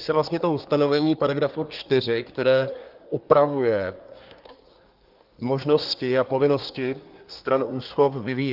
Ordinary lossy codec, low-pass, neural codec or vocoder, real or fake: Opus, 32 kbps; 5.4 kHz; codec, 24 kHz, 1 kbps, SNAC; fake